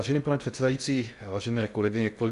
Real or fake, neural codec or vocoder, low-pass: fake; codec, 16 kHz in and 24 kHz out, 0.6 kbps, FocalCodec, streaming, 2048 codes; 10.8 kHz